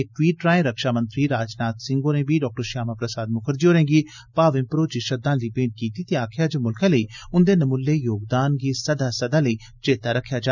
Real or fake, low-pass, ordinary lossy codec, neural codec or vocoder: real; 7.2 kHz; none; none